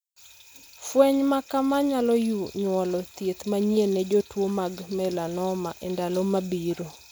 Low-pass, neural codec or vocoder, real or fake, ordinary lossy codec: none; none; real; none